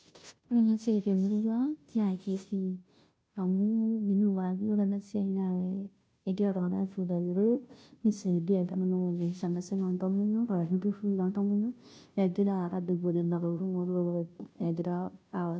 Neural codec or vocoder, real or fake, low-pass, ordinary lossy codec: codec, 16 kHz, 0.5 kbps, FunCodec, trained on Chinese and English, 25 frames a second; fake; none; none